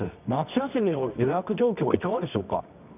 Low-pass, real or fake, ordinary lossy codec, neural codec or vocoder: 3.6 kHz; fake; none; codec, 24 kHz, 0.9 kbps, WavTokenizer, medium music audio release